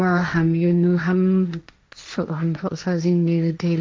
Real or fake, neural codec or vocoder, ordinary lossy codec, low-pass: fake; codec, 16 kHz, 1.1 kbps, Voila-Tokenizer; none; none